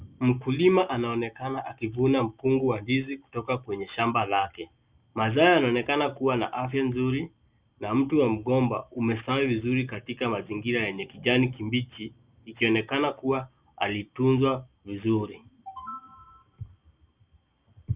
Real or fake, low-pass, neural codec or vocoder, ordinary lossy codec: real; 3.6 kHz; none; Opus, 64 kbps